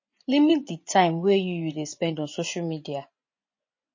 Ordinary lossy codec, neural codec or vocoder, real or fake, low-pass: MP3, 32 kbps; vocoder, 24 kHz, 100 mel bands, Vocos; fake; 7.2 kHz